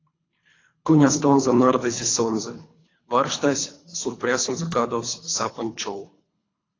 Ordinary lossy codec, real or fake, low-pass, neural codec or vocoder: AAC, 32 kbps; fake; 7.2 kHz; codec, 24 kHz, 3 kbps, HILCodec